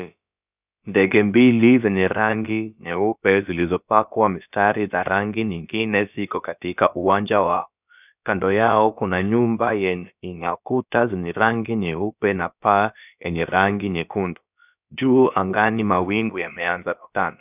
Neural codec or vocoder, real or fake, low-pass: codec, 16 kHz, about 1 kbps, DyCAST, with the encoder's durations; fake; 3.6 kHz